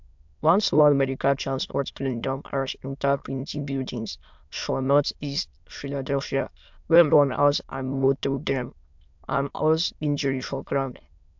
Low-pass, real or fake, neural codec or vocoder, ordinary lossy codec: 7.2 kHz; fake; autoencoder, 22.05 kHz, a latent of 192 numbers a frame, VITS, trained on many speakers; MP3, 64 kbps